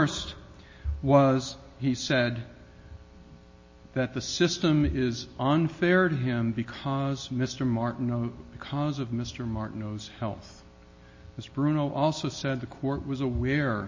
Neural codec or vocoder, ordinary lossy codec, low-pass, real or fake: none; MP3, 32 kbps; 7.2 kHz; real